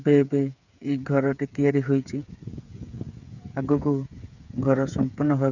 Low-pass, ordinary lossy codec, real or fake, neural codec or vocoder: 7.2 kHz; none; fake; codec, 16 kHz, 8 kbps, FreqCodec, smaller model